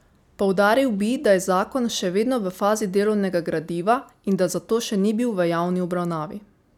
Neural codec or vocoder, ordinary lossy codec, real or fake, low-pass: none; none; real; 19.8 kHz